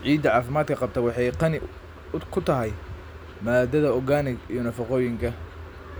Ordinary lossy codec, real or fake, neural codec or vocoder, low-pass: none; real; none; none